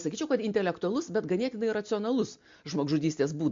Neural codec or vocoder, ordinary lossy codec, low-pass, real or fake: none; MP3, 48 kbps; 7.2 kHz; real